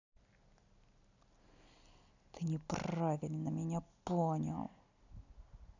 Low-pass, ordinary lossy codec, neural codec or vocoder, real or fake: 7.2 kHz; none; none; real